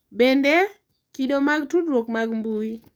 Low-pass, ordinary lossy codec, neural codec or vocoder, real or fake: none; none; codec, 44.1 kHz, 7.8 kbps, DAC; fake